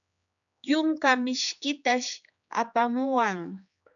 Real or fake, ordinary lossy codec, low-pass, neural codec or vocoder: fake; MP3, 96 kbps; 7.2 kHz; codec, 16 kHz, 4 kbps, X-Codec, HuBERT features, trained on general audio